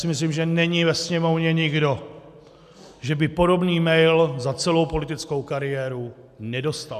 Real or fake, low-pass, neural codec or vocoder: real; 14.4 kHz; none